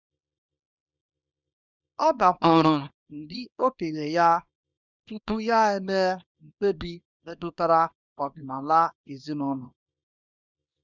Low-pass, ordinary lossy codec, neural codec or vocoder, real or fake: 7.2 kHz; none; codec, 24 kHz, 0.9 kbps, WavTokenizer, small release; fake